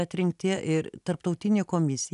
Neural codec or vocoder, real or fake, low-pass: none; real; 10.8 kHz